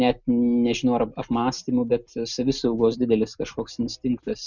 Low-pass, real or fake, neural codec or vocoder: 7.2 kHz; real; none